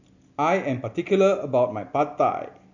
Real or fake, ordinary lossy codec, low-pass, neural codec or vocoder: real; none; 7.2 kHz; none